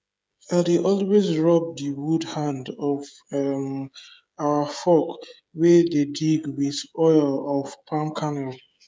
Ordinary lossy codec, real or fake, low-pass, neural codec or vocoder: none; fake; none; codec, 16 kHz, 16 kbps, FreqCodec, smaller model